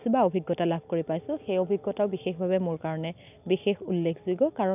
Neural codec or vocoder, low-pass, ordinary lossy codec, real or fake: none; 3.6 kHz; none; real